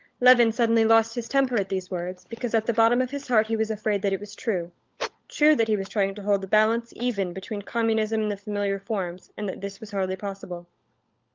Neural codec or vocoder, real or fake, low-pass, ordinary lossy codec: codec, 16 kHz, 16 kbps, FunCodec, trained on LibriTTS, 50 frames a second; fake; 7.2 kHz; Opus, 24 kbps